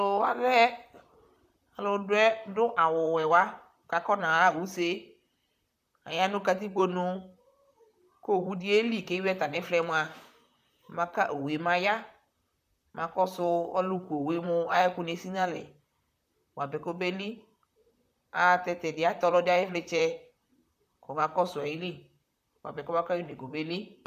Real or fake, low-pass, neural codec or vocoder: fake; 14.4 kHz; vocoder, 44.1 kHz, 128 mel bands, Pupu-Vocoder